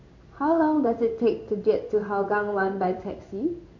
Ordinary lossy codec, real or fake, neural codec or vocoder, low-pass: MP3, 48 kbps; fake; codec, 16 kHz in and 24 kHz out, 1 kbps, XY-Tokenizer; 7.2 kHz